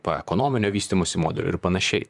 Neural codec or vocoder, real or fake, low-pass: vocoder, 44.1 kHz, 128 mel bands, Pupu-Vocoder; fake; 10.8 kHz